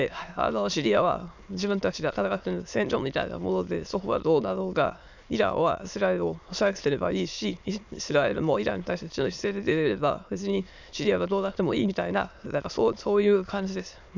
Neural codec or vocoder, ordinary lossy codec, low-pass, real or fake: autoencoder, 22.05 kHz, a latent of 192 numbers a frame, VITS, trained on many speakers; none; 7.2 kHz; fake